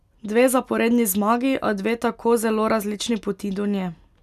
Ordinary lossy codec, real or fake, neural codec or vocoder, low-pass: none; real; none; 14.4 kHz